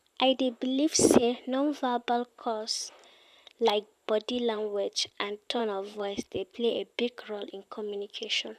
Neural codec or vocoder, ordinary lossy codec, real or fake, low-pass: vocoder, 44.1 kHz, 128 mel bands, Pupu-Vocoder; none; fake; 14.4 kHz